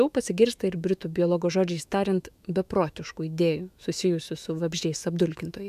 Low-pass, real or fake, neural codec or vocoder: 14.4 kHz; fake; autoencoder, 48 kHz, 128 numbers a frame, DAC-VAE, trained on Japanese speech